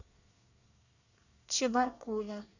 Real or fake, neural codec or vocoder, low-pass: fake; codec, 24 kHz, 1 kbps, SNAC; 7.2 kHz